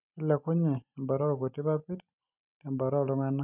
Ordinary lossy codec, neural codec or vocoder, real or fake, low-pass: none; none; real; 3.6 kHz